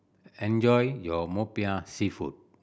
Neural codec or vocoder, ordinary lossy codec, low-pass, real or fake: none; none; none; real